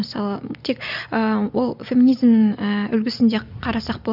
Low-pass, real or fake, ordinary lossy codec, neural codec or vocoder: 5.4 kHz; real; none; none